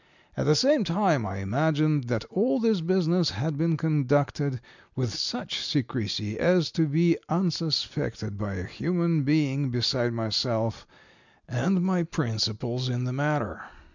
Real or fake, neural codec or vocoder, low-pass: real; none; 7.2 kHz